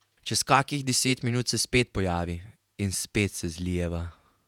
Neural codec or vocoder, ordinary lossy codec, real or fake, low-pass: vocoder, 48 kHz, 128 mel bands, Vocos; none; fake; 19.8 kHz